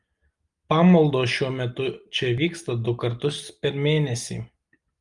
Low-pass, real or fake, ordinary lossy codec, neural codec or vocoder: 10.8 kHz; real; Opus, 32 kbps; none